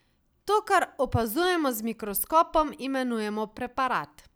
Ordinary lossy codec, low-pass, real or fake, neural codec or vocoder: none; none; real; none